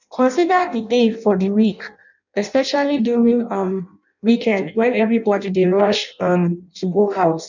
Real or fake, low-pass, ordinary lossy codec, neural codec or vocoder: fake; 7.2 kHz; none; codec, 16 kHz in and 24 kHz out, 0.6 kbps, FireRedTTS-2 codec